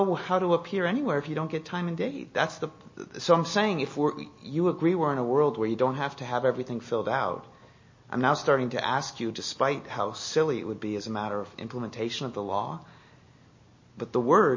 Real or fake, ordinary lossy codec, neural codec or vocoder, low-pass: real; MP3, 32 kbps; none; 7.2 kHz